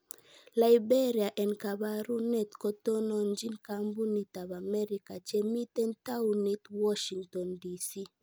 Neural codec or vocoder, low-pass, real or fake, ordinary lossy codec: none; none; real; none